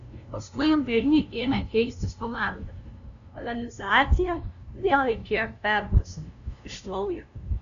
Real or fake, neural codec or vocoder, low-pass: fake; codec, 16 kHz, 0.5 kbps, FunCodec, trained on LibriTTS, 25 frames a second; 7.2 kHz